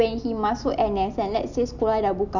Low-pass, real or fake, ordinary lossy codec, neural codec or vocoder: 7.2 kHz; real; none; none